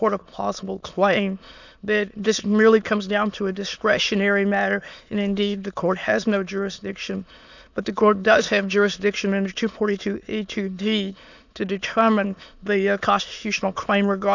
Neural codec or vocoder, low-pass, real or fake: autoencoder, 22.05 kHz, a latent of 192 numbers a frame, VITS, trained on many speakers; 7.2 kHz; fake